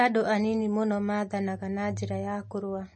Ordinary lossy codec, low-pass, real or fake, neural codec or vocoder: MP3, 32 kbps; 9.9 kHz; real; none